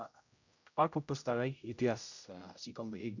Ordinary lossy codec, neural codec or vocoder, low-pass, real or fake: none; codec, 16 kHz, 0.5 kbps, X-Codec, HuBERT features, trained on general audio; 7.2 kHz; fake